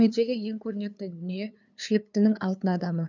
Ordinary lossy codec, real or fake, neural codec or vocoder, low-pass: none; fake; codec, 16 kHz, 2 kbps, FunCodec, trained on LibriTTS, 25 frames a second; 7.2 kHz